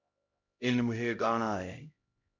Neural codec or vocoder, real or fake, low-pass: codec, 16 kHz, 0.5 kbps, X-Codec, HuBERT features, trained on LibriSpeech; fake; 7.2 kHz